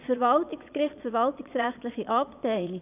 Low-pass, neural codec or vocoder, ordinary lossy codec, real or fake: 3.6 kHz; vocoder, 22.05 kHz, 80 mel bands, Vocos; none; fake